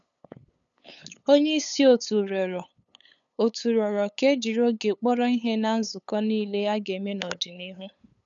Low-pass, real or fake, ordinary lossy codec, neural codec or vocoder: 7.2 kHz; fake; none; codec, 16 kHz, 8 kbps, FunCodec, trained on LibriTTS, 25 frames a second